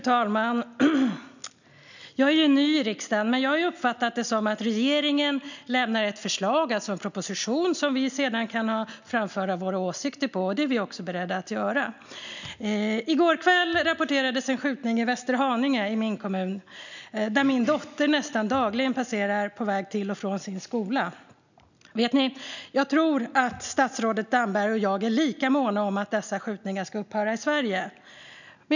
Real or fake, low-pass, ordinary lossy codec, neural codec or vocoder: real; 7.2 kHz; none; none